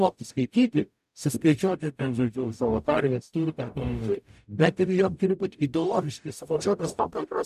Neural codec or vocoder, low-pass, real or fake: codec, 44.1 kHz, 0.9 kbps, DAC; 14.4 kHz; fake